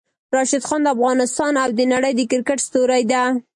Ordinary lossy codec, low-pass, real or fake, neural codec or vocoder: MP3, 48 kbps; 10.8 kHz; real; none